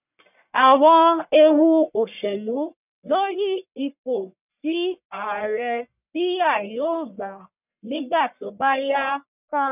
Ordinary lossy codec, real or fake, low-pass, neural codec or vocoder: none; fake; 3.6 kHz; codec, 44.1 kHz, 1.7 kbps, Pupu-Codec